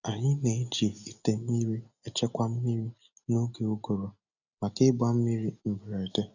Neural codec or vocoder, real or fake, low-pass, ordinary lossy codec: none; real; 7.2 kHz; none